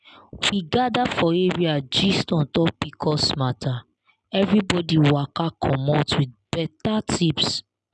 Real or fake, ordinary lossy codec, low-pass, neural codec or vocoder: real; none; 10.8 kHz; none